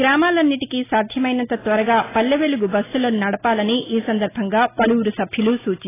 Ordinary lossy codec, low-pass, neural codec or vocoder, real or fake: AAC, 16 kbps; 3.6 kHz; none; real